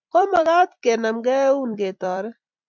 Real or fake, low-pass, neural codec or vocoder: fake; 7.2 kHz; vocoder, 44.1 kHz, 128 mel bands every 256 samples, BigVGAN v2